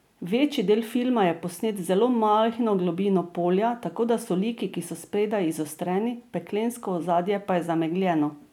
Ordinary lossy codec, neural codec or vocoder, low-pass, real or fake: none; none; 19.8 kHz; real